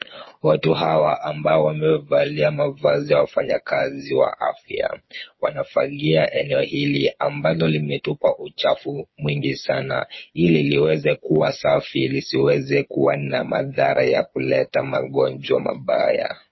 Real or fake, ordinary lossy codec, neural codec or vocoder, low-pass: fake; MP3, 24 kbps; codec, 16 kHz, 8 kbps, FreqCodec, smaller model; 7.2 kHz